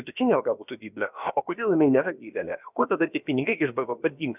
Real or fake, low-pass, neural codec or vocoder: fake; 3.6 kHz; codec, 16 kHz, about 1 kbps, DyCAST, with the encoder's durations